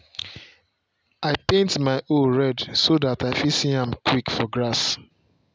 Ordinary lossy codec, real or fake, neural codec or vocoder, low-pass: none; real; none; none